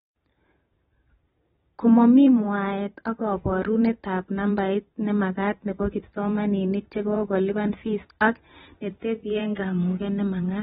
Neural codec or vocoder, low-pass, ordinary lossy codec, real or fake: vocoder, 44.1 kHz, 128 mel bands every 512 samples, BigVGAN v2; 19.8 kHz; AAC, 16 kbps; fake